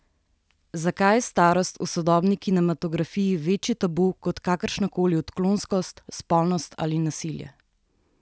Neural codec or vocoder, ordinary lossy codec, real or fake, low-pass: none; none; real; none